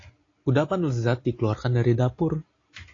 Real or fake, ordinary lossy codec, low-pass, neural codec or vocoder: real; AAC, 32 kbps; 7.2 kHz; none